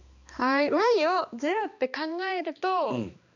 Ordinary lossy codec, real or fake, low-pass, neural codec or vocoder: none; fake; 7.2 kHz; codec, 16 kHz, 2 kbps, X-Codec, HuBERT features, trained on balanced general audio